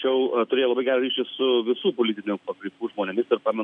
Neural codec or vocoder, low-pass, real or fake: none; 9.9 kHz; real